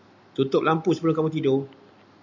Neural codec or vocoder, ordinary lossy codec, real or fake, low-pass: none; MP3, 64 kbps; real; 7.2 kHz